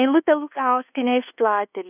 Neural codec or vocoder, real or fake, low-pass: codec, 24 kHz, 1.2 kbps, DualCodec; fake; 3.6 kHz